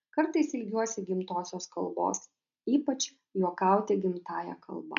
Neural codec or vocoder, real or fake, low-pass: none; real; 7.2 kHz